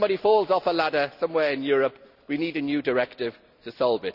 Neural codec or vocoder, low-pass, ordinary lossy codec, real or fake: none; 5.4 kHz; none; real